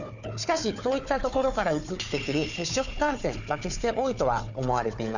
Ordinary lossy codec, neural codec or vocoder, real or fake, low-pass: none; codec, 16 kHz, 4 kbps, FunCodec, trained on Chinese and English, 50 frames a second; fake; 7.2 kHz